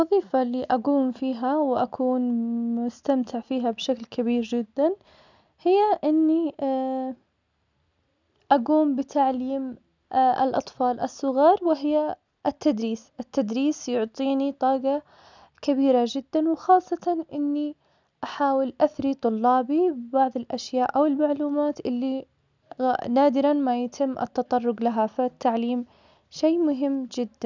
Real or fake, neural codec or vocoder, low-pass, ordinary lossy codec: real; none; 7.2 kHz; none